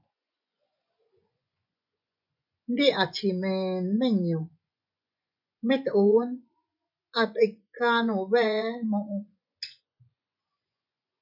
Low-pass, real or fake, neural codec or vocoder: 5.4 kHz; fake; vocoder, 24 kHz, 100 mel bands, Vocos